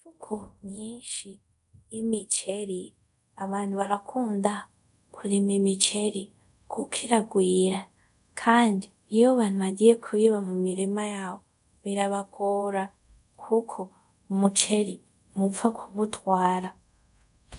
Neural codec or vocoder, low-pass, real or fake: codec, 24 kHz, 0.5 kbps, DualCodec; 10.8 kHz; fake